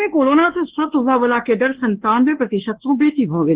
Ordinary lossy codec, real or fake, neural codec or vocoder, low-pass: Opus, 16 kbps; fake; codec, 24 kHz, 1.2 kbps, DualCodec; 3.6 kHz